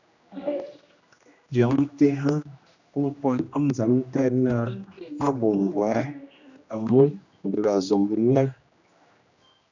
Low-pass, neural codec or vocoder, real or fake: 7.2 kHz; codec, 16 kHz, 1 kbps, X-Codec, HuBERT features, trained on general audio; fake